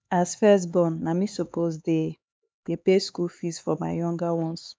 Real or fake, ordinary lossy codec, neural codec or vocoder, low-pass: fake; none; codec, 16 kHz, 4 kbps, X-Codec, HuBERT features, trained on LibriSpeech; none